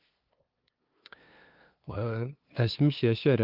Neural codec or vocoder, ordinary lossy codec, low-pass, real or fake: codec, 16 kHz, 2 kbps, FunCodec, trained on LibriTTS, 25 frames a second; Opus, 32 kbps; 5.4 kHz; fake